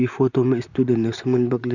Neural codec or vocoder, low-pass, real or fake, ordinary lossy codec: vocoder, 44.1 kHz, 128 mel bands, Pupu-Vocoder; 7.2 kHz; fake; none